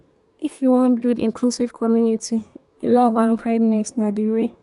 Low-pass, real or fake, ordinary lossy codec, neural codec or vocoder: 10.8 kHz; fake; none; codec, 24 kHz, 1 kbps, SNAC